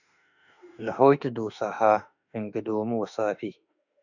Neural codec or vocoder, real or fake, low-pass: autoencoder, 48 kHz, 32 numbers a frame, DAC-VAE, trained on Japanese speech; fake; 7.2 kHz